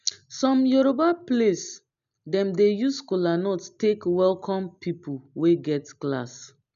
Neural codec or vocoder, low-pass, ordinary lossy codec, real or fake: none; 7.2 kHz; none; real